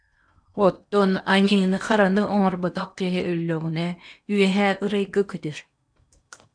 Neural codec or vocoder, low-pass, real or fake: codec, 16 kHz in and 24 kHz out, 0.8 kbps, FocalCodec, streaming, 65536 codes; 9.9 kHz; fake